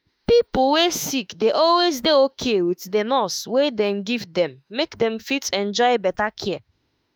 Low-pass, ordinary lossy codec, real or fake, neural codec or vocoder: none; none; fake; autoencoder, 48 kHz, 32 numbers a frame, DAC-VAE, trained on Japanese speech